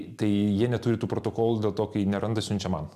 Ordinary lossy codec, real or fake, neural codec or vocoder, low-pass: AAC, 96 kbps; real; none; 14.4 kHz